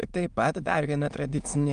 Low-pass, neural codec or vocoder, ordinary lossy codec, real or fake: 9.9 kHz; autoencoder, 22.05 kHz, a latent of 192 numbers a frame, VITS, trained on many speakers; Opus, 32 kbps; fake